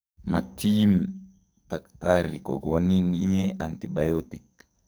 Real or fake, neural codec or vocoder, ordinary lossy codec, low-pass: fake; codec, 44.1 kHz, 2.6 kbps, SNAC; none; none